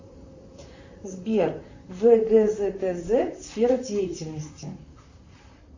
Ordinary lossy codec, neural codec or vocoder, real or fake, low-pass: Opus, 64 kbps; vocoder, 44.1 kHz, 128 mel bands, Pupu-Vocoder; fake; 7.2 kHz